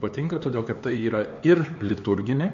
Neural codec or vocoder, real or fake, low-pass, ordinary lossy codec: codec, 16 kHz, 4 kbps, X-Codec, HuBERT features, trained on LibriSpeech; fake; 7.2 kHz; MP3, 48 kbps